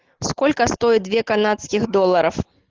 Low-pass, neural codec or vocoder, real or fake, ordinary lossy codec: 7.2 kHz; none; real; Opus, 16 kbps